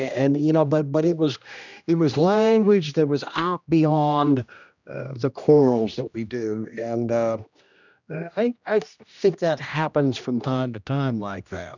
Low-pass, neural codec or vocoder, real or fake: 7.2 kHz; codec, 16 kHz, 1 kbps, X-Codec, HuBERT features, trained on general audio; fake